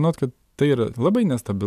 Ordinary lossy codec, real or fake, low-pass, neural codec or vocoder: AAC, 96 kbps; real; 14.4 kHz; none